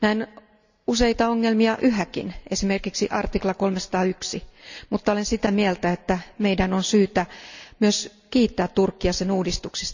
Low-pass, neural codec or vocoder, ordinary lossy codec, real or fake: 7.2 kHz; none; none; real